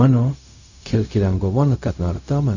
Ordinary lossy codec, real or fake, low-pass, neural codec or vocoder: none; fake; 7.2 kHz; codec, 16 kHz, 0.4 kbps, LongCat-Audio-Codec